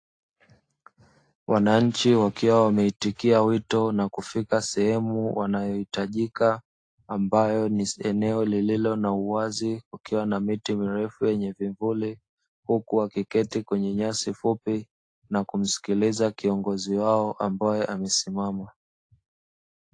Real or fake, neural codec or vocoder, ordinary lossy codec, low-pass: real; none; AAC, 48 kbps; 9.9 kHz